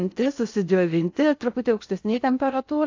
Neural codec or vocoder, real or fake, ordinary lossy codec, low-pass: codec, 16 kHz in and 24 kHz out, 0.6 kbps, FocalCodec, streaming, 2048 codes; fake; AAC, 48 kbps; 7.2 kHz